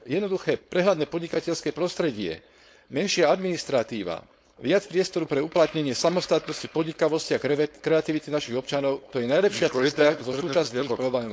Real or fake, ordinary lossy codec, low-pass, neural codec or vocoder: fake; none; none; codec, 16 kHz, 4.8 kbps, FACodec